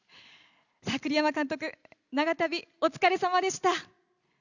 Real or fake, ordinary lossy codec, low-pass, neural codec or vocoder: real; none; 7.2 kHz; none